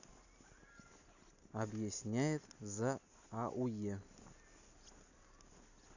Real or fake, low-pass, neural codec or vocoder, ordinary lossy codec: real; 7.2 kHz; none; none